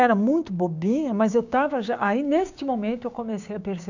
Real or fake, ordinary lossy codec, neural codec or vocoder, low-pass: fake; none; codec, 16 kHz, 6 kbps, DAC; 7.2 kHz